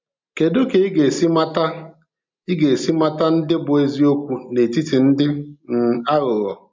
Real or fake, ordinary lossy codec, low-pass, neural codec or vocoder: real; MP3, 64 kbps; 7.2 kHz; none